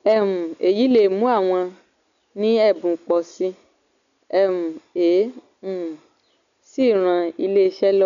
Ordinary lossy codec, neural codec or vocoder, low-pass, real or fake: none; none; 7.2 kHz; real